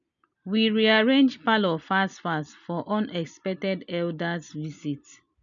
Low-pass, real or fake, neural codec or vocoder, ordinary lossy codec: 7.2 kHz; real; none; none